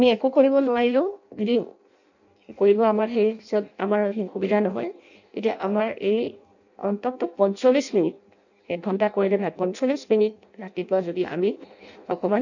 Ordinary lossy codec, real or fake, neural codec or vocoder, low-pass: none; fake; codec, 16 kHz in and 24 kHz out, 0.6 kbps, FireRedTTS-2 codec; 7.2 kHz